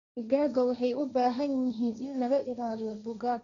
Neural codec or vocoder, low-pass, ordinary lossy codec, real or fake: codec, 16 kHz, 1.1 kbps, Voila-Tokenizer; 7.2 kHz; none; fake